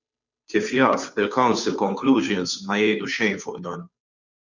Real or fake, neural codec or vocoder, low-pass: fake; codec, 16 kHz, 2 kbps, FunCodec, trained on Chinese and English, 25 frames a second; 7.2 kHz